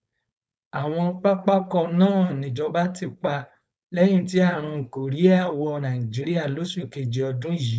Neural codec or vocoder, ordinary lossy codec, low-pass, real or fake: codec, 16 kHz, 4.8 kbps, FACodec; none; none; fake